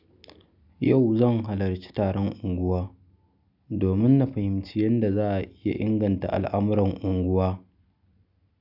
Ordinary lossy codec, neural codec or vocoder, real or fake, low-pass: none; none; real; 5.4 kHz